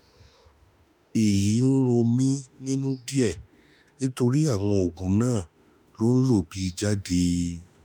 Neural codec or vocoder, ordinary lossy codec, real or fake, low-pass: autoencoder, 48 kHz, 32 numbers a frame, DAC-VAE, trained on Japanese speech; none; fake; none